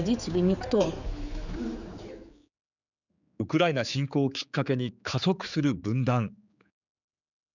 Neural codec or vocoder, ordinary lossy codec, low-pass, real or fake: codec, 16 kHz, 4 kbps, X-Codec, HuBERT features, trained on general audio; none; 7.2 kHz; fake